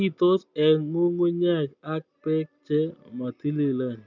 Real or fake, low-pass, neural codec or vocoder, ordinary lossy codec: real; 7.2 kHz; none; none